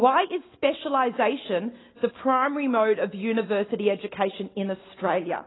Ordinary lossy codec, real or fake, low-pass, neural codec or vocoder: AAC, 16 kbps; real; 7.2 kHz; none